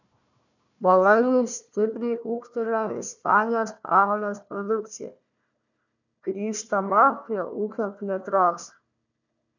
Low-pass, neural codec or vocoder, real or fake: 7.2 kHz; codec, 16 kHz, 1 kbps, FunCodec, trained on Chinese and English, 50 frames a second; fake